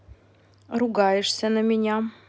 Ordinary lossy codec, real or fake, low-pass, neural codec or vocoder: none; real; none; none